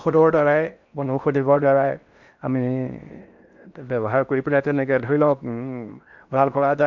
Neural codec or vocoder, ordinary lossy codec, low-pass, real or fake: codec, 16 kHz in and 24 kHz out, 0.6 kbps, FocalCodec, streaming, 4096 codes; none; 7.2 kHz; fake